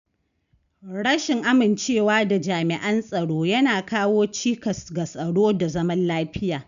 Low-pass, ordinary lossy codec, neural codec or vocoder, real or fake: 7.2 kHz; none; none; real